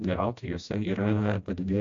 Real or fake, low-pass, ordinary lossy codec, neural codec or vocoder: fake; 7.2 kHz; AAC, 48 kbps; codec, 16 kHz, 1 kbps, FreqCodec, smaller model